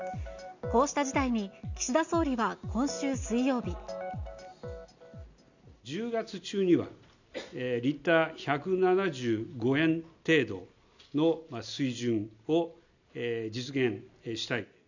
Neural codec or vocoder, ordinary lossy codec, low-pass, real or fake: none; none; 7.2 kHz; real